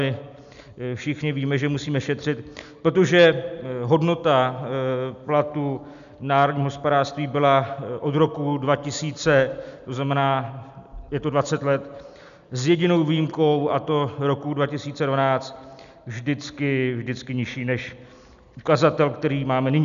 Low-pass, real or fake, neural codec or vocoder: 7.2 kHz; real; none